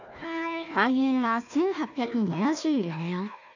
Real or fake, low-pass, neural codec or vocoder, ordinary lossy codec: fake; 7.2 kHz; codec, 16 kHz, 1 kbps, FunCodec, trained on Chinese and English, 50 frames a second; none